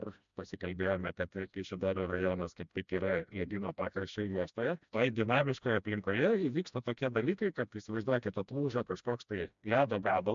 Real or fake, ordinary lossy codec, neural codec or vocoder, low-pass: fake; MP3, 64 kbps; codec, 16 kHz, 1 kbps, FreqCodec, smaller model; 7.2 kHz